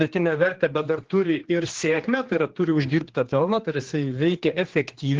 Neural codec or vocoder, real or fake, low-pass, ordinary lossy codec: codec, 16 kHz, 2 kbps, X-Codec, HuBERT features, trained on general audio; fake; 7.2 kHz; Opus, 16 kbps